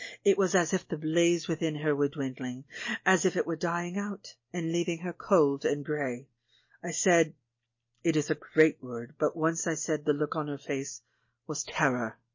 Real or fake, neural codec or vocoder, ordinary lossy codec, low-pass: real; none; MP3, 32 kbps; 7.2 kHz